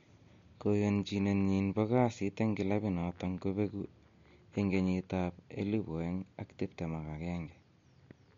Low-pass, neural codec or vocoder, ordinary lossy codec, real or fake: 7.2 kHz; none; MP3, 48 kbps; real